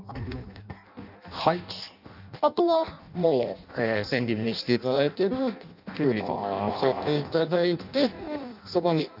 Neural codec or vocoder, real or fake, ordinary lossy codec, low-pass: codec, 16 kHz in and 24 kHz out, 0.6 kbps, FireRedTTS-2 codec; fake; none; 5.4 kHz